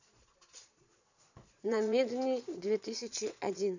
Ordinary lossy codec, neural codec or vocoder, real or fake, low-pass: none; vocoder, 22.05 kHz, 80 mel bands, Vocos; fake; 7.2 kHz